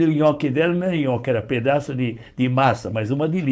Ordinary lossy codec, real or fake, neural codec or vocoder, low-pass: none; fake; codec, 16 kHz, 4.8 kbps, FACodec; none